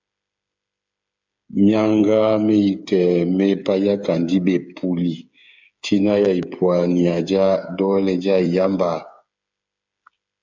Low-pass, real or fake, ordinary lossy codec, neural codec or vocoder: 7.2 kHz; fake; MP3, 64 kbps; codec, 16 kHz, 8 kbps, FreqCodec, smaller model